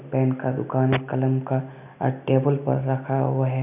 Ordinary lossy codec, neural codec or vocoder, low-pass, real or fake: none; none; 3.6 kHz; real